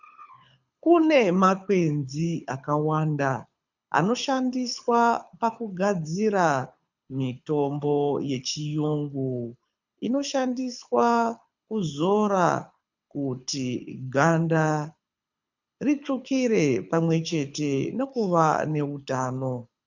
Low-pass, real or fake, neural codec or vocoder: 7.2 kHz; fake; codec, 24 kHz, 6 kbps, HILCodec